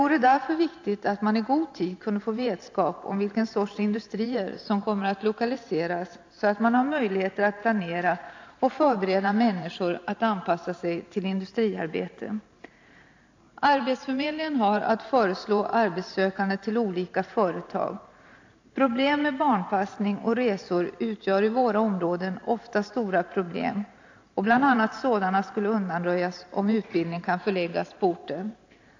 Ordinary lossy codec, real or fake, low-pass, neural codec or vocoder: AAC, 48 kbps; fake; 7.2 kHz; vocoder, 44.1 kHz, 128 mel bands every 512 samples, BigVGAN v2